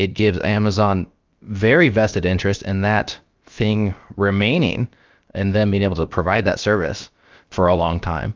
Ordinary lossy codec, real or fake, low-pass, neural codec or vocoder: Opus, 24 kbps; fake; 7.2 kHz; codec, 16 kHz, about 1 kbps, DyCAST, with the encoder's durations